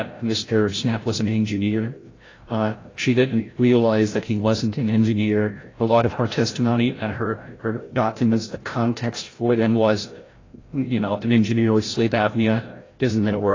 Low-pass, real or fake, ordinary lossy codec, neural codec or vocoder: 7.2 kHz; fake; AAC, 32 kbps; codec, 16 kHz, 0.5 kbps, FreqCodec, larger model